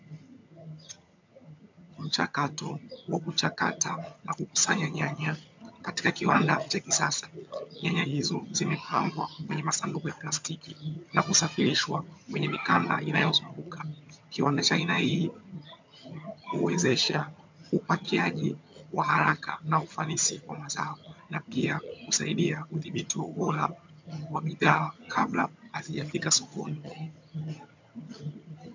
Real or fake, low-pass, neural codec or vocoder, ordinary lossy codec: fake; 7.2 kHz; vocoder, 22.05 kHz, 80 mel bands, HiFi-GAN; MP3, 64 kbps